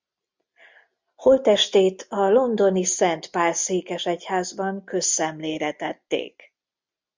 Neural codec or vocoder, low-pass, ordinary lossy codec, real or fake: none; 7.2 kHz; MP3, 64 kbps; real